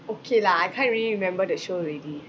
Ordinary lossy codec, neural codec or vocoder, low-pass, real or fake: none; none; none; real